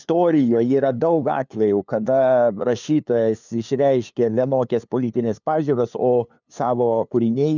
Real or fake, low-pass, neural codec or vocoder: fake; 7.2 kHz; codec, 16 kHz, 2 kbps, FunCodec, trained on LibriTTS, 25 frames a second